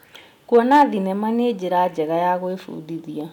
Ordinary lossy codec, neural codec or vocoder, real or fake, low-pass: none; none; real; 19.8 kHz